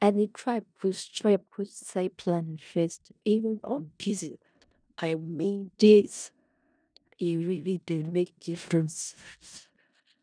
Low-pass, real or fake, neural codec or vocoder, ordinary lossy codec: 9.9 kHz; fake; codec, 16 kHz in and 24 kHz out, 0.4 kbps, LongCat-Audio-Codec, four codebook decoder; none